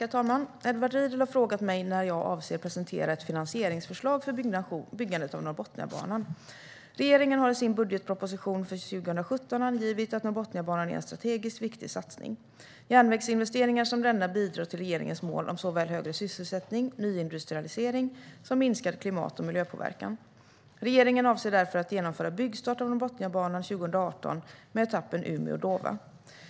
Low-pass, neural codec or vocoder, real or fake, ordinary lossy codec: none; none; real; none